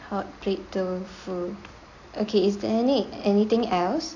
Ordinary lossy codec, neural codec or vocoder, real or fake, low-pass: AAC, 48 kbps; none; real; 7.2 kHz